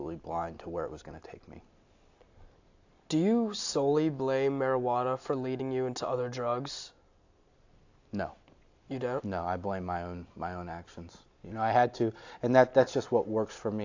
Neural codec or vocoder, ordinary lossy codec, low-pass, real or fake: none; AAC, 48 kbps; 7.2 kHz; real